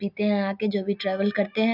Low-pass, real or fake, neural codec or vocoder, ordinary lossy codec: 5.4 kHz; real; none; none